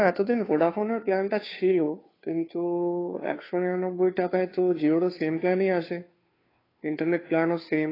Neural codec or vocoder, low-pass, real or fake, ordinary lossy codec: codec, 16 kHz, 2 kbps, FunCodec, trained on Chinese and English, 25 frames a second; 5.4 kHz; fake; AAC, 24 kbps